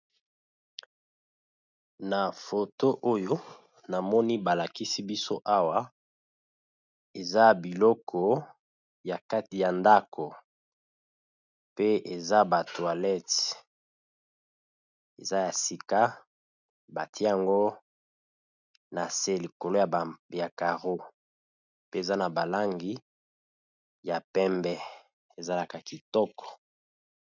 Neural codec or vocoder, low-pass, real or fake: none; 7.2 kHz; real